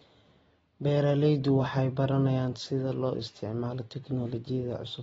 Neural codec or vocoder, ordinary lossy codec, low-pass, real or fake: none; AAC, 24 kbps; 19.8 kHz; real